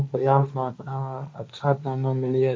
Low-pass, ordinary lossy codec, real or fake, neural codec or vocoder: none; none; fake; codec, 16 kHz, 1.1 kbps, Voila-Tokenizer